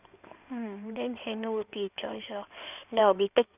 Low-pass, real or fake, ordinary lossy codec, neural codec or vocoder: 3.6 kHz; fake; none; codec, 16 kHz in and 24 kHz out, 2.2 kbps, FireRedTTS-2 codec